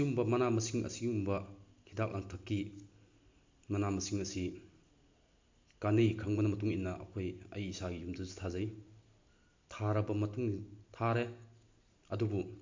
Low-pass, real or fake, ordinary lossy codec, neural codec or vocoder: 7.2 kHz; real; MP3, 64 kbps; none